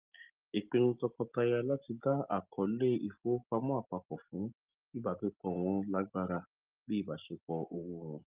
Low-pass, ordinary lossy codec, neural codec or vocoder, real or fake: 3.6 kHz; Opus, 32 kbps; none; real